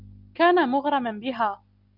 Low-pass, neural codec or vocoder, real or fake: 5.4 kHz; none; real